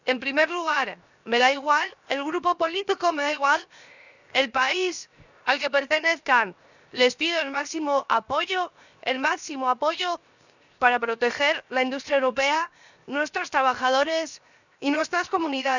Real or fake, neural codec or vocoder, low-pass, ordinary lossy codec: fake; codec, 16 kHz, 0.7 kbps, FocalCodec; 7.2 kHz; none